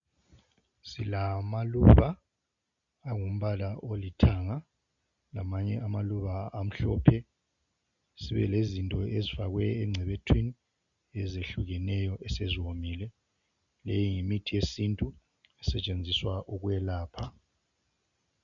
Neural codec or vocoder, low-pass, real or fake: none; 7.2 kHz; real